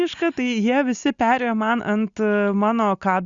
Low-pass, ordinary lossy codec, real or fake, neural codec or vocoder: 7.2 kHz; Opus, 64 kbps; real; none